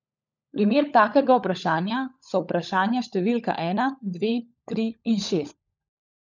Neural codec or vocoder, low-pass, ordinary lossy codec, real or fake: codec, 16 kHz, 16 kbps, FunCodec, trained on LibriTTS, 50 frames a second; 7.2 kHz; none; fake